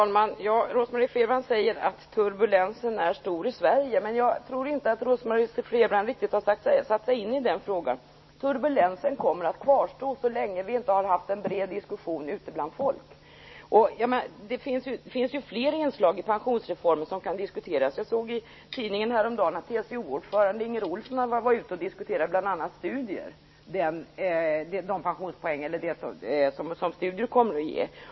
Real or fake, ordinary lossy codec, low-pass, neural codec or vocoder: real; MP3, 24 kbps; 7.2 kHz; none